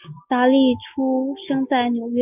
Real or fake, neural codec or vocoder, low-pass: real; none; 3.6 kHz